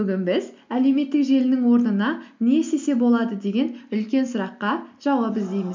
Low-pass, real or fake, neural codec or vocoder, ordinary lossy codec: 7.2 kHz; real; none; MP3, 64 kbps